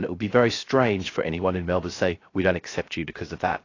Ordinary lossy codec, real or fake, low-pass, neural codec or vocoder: AAC, 32 kbps; fake; 7.2 kHz; codec, 16 kHz, 0.3 kbps, FocalCodec